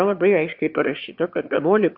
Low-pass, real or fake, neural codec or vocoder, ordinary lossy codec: 5.4 kHz; fake; autoencoder, 22.05 kHz, a latent of 192 numbers a frame, VITS, trained on one speaker; Opus, 64 kbps